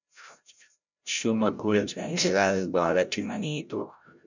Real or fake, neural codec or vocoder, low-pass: fake; codec, 16 kHz, 0.5 kbps, FreqCodec, larger model; 7.2 kHz